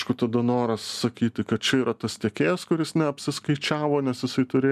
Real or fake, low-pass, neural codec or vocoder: real; 14.4 kHz; none